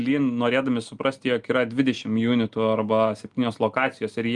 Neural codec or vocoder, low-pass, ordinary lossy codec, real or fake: none; 10.8 kHz; Opus, 32 kbps; real